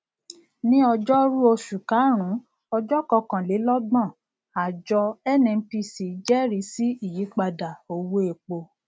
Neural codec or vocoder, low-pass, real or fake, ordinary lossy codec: none; none; real; none